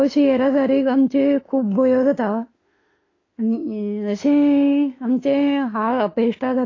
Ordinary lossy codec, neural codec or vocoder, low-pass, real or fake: AAC, 32 kbps; autoencoder, 48 kHz, 32 numbers a frame, DAC-VAE, trained on Japanese speech; 7.2 kHz; fake